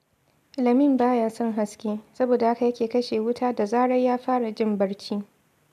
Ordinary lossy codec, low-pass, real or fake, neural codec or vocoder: none; 14.4 kHz; real; none